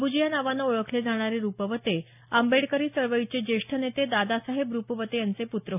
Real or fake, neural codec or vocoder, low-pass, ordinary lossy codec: real; none; 3.6 kHz; none